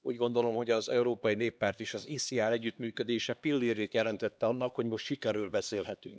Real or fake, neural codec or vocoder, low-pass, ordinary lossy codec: fake; codec, 16 kHz, 2 kbps, X-Codec, HuBERT features, trained on LibriSpeech; none; none